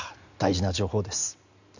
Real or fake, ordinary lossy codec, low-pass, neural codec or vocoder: real; none; 7.2 kHz; none